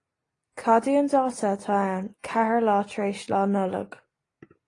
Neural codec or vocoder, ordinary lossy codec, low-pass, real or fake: none; AAC, 32 kbps; 10.8 kHz; real